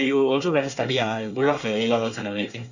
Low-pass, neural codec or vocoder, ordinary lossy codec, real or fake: 7.2 kHz; codec, 24 kHz, 1 kbps, SNAC; none; fake